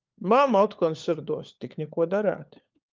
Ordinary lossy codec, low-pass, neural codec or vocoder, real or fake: Opus, 24 kbps; 7.2 kHz; codec, 16 kHz, 4 kbps, FunCodec, trained on LibriTTS, 50 frames a second; fake